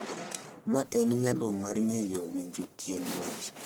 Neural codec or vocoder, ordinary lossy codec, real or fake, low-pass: codec, 44.1 kHz, 1.7 kbps, Pupu-Codec; none; fake; none